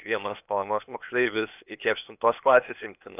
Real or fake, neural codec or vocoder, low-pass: fake; codec, 16 kHz, 0.8 kbps, ZipCodec; 3.6 kHz